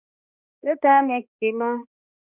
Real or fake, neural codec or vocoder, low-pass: fake; codec, 16 kHz, 1 kbps, X-Codec, HuBERT features, trained on balanced general audio; 3.6 kHz